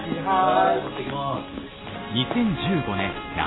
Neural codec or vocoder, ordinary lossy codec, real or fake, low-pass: none; AAC, 16 kbps; real; 7.2 kHz